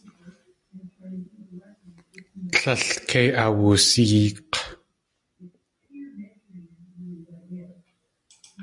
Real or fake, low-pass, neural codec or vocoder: real; 10.8 kHz; none